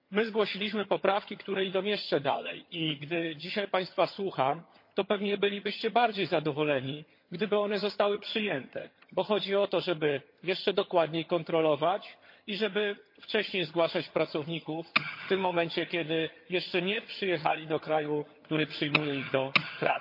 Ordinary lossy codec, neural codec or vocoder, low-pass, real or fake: MP3, 32 kbps; vocoder, 22.05 kHz, 80 mel bands, HiFi-GAN; 5.4 kHz; fake